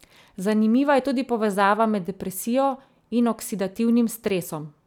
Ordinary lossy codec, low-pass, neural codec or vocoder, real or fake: none; 19.8 kHz; none; real